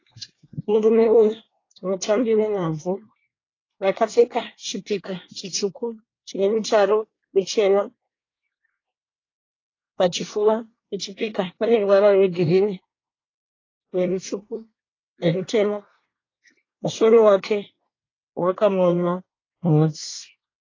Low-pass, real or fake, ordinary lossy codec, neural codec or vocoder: 7.2 kHz; fake; AAC, 32 kbps; codec, 24 kHz, 1 kbps, SNAC